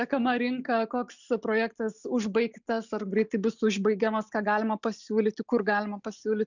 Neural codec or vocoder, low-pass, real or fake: none; 7.2 kHz; real